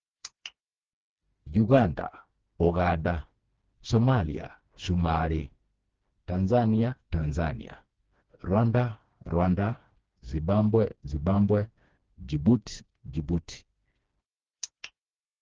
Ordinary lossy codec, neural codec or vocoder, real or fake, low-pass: Opus, 16 kbps; codec, 16 kHz, 2 kbps, FreqCodec, smaller model; fake; 7.2 kHz